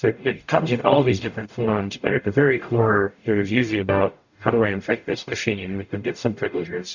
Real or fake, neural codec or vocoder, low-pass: fake; codec, 44.1 kHz, 0.9 kbps, DAC; 7.2 kHz